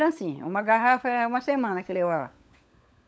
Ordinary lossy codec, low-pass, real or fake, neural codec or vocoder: none; none; fake; codec, 16 kHz, 16 kbps, FunCodec, trained on LibriTTS, 50 frames a second